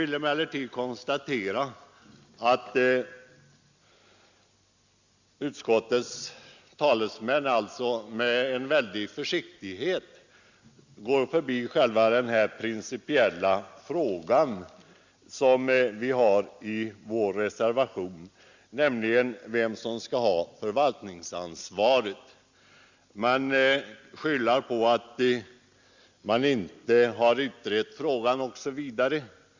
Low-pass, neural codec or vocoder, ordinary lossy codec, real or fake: 7.2 kHz; none; Opus, 64 kbps; real